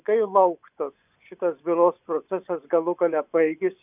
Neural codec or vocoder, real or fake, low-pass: vocoder, 24 kHz, 100 mel bands, Vocos; fake; 3.6 kHz